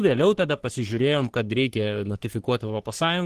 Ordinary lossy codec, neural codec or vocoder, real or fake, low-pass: Opus, 16 kbps; codec, 44.1 kHz, 3.4 kbps, Pupu-Codec; fake; 14.4 kHz